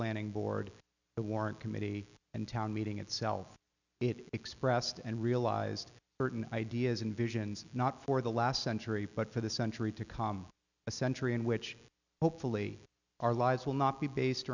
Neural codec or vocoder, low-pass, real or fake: none; 7.2 kHz; real